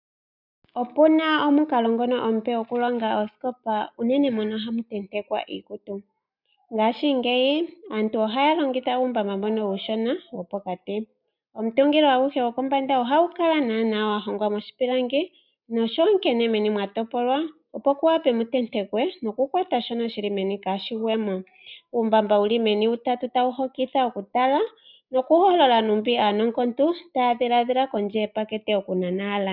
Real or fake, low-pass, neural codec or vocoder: real; 5.4 kHz; none